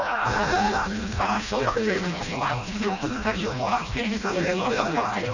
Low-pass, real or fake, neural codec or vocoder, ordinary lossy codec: 7.2 kHz; fake; codec, 16 kHz, 1 kbps, FreqCodec, smaller model; none